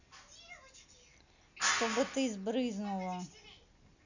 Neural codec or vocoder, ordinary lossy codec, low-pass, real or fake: none; none; 7.2 kHz; real